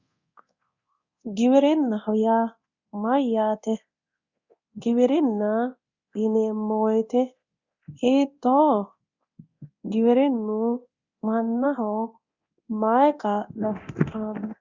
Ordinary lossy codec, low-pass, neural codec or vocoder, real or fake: Opus, 64 kbps; 7.2 kHz; codec, 24 kHz, 0.9 kbps, DualCodec; fake